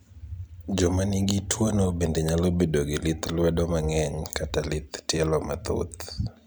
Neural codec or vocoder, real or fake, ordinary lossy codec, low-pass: vocoder, 44.1 kHz, 128 mel bands every 256 samples, BigVGAN v2; fake; none; none